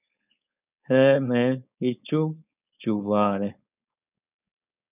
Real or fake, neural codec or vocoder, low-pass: fake; codec, 16 kHz, 4.8 kbps, FACodec; 3.6 kHz